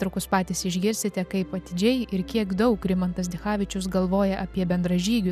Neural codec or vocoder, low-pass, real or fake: none; 14.4 kHz; real